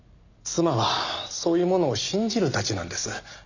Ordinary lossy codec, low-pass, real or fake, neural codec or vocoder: none; 7.2 kHz; real; none